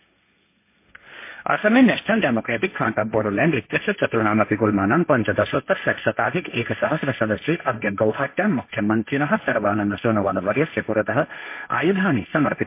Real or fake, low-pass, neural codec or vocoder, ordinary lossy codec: fake; 3.6 kHz; codec, 16 kHz, 1.1 kbps, Voila-Tokenizer; MP3, 24 kbps